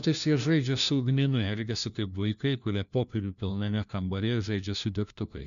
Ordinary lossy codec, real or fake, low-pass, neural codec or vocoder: MP3, 96 kbps; fake; 7.2 kHz; codec, 16 kHz, 1 kbps, FunCodec, trained on LibriTTS, 50 frames a second